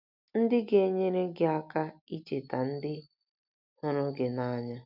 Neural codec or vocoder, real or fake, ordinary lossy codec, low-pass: none; real; none; 5.4 kHz